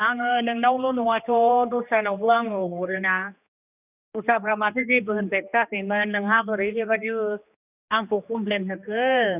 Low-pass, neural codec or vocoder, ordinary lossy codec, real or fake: 3.6 kHz; codec, 16 kHz, 2 kbps, X-Codec, HuBERT features, trained on general audio; none; fake